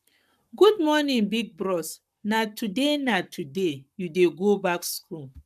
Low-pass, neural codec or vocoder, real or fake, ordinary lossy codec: 14.4 kHz; codec, 44.1 kHz, 7.8 kbps, Pupu-Codec; fake; none